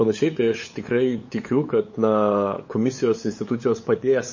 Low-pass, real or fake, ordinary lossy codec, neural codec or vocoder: 7.2 kHz; fake; MP3, 32 kbps; codec, 16 kHz, 8 kbps, FunCodec, trained on LibriTTS, 25 frames a second